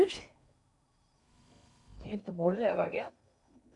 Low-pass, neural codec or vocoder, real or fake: 10.8 kHz; codec, 16 kHz in and 24 kHz out, 0.8 kbps, FocalCodec, streaming, 65536 codes; fake